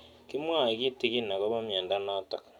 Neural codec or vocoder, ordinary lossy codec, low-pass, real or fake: none; none; 19.8 kHz; real